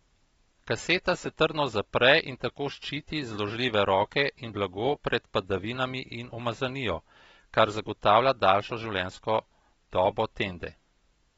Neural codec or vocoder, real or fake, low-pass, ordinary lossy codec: none; real; 10.8 kHz; AAC, 24 kbps